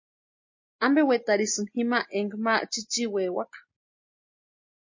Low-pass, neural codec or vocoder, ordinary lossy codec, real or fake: 7.2 kHz; none; MP3, 32 kbps; real